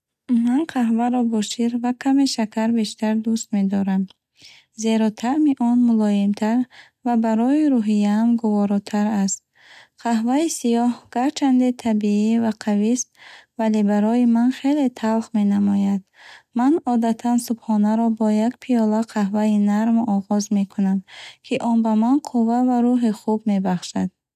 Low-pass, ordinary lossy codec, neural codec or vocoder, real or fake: 14.4 kHz; none; none; real